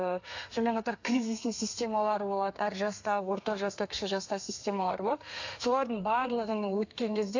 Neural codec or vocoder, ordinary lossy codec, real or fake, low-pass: codec, 32 kHz, 1.9 kbps, SNAC; AAC, 48 kbps; fake; 7.2 kHz